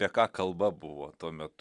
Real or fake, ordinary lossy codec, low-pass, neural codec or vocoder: real; Opus, 64 kbps; 10.8 kHz; none